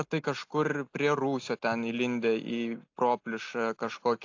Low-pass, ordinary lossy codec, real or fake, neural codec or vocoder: 7.2 kHz; AAC, 48 kbps; real; none